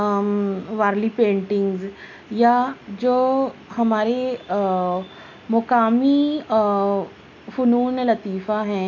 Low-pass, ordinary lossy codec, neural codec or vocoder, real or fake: 7.2 kHz; none; none; real